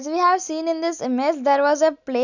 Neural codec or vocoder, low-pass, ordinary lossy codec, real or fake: none; 7.2 kHz; none; real